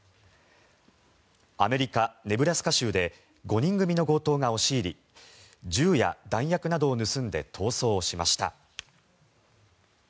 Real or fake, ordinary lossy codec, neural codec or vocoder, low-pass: real; none; none; none